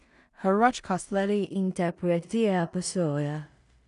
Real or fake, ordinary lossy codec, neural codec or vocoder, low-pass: fake; none; codec, 16 kHz in and 24 kHz out, 0.4 kbps, LongCat-Audio-Codec, two codebook decoder; 10.8 kHz